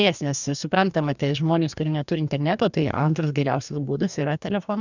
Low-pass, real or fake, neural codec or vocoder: 7.2 kHz; fake; codec, 44.1 kHz, 2.6 kbps, DAC